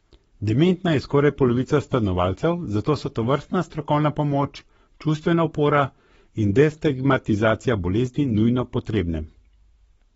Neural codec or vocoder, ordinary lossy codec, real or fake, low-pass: codec, 44.1 kHz, 7.8 kbps, Pupu-Codec; AAC, 24 kbps; fake; 19.8 kHz